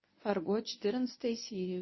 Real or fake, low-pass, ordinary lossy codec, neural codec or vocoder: fake; 7.2 kHz; MP3, 24 kbps; codec, 24 kHz, 0.9 kbps, WavTokenizer, medium speech release version 1